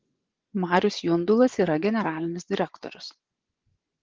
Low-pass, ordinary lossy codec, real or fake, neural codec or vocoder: 7.2 kHz; Opus, 16 kbps; fake; vocoder, 24 kHz, 100 mel bands, Vocos